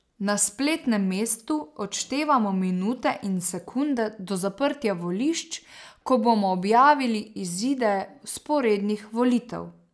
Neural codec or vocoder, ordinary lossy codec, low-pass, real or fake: none; none; none; real